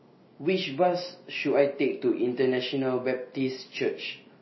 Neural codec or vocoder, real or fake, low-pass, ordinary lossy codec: none; real; 7.2 kHz; MP3, 24 kbps